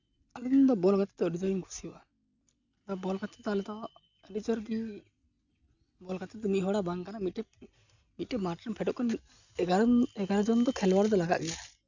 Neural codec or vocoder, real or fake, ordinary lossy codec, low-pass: none; real; none; 7.2 kHz